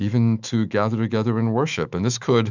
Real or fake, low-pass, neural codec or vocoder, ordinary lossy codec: fake; 7.2 kHz; autoencoder, 48 kHz, 128 numbers a frame, DAC-VAE, trained on Japanese speech; Opus, 64 kbps